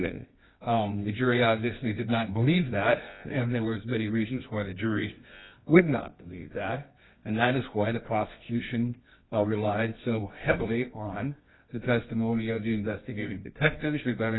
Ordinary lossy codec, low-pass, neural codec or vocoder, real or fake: AAC, 16 kbps; 7.2 kHz; codec, 24 kHz, 0.9 kbps, WavTokenizer, medium music audio release; fake